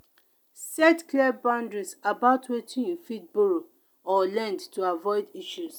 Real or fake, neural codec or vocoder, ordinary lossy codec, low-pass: real; none; none; none